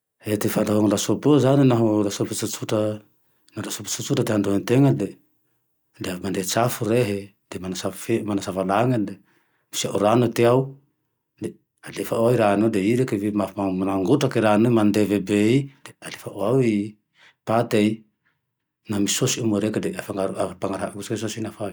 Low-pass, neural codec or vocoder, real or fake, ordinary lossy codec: none; none; real; none